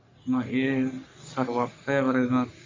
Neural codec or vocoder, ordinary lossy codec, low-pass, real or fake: codec, 44.1 kHz, 3.4 kbps, Pupu-Codec; MP3, 64 kbps; 7.2 kHz; fake